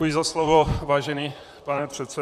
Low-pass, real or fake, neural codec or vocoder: 14.4 kHz; fake; vocoder, 44.1 kHz, 128 mel bands, Pupu-Vocoder